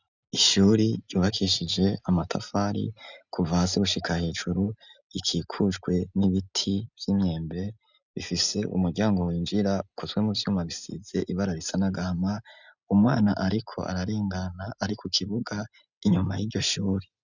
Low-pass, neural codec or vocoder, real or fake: 7.2 kHz; none; real